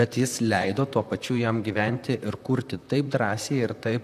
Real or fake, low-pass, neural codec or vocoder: fake; 14.4 kHz; vocoder, 44.1 kHz, 128 mel bands, Pupu-Vocoder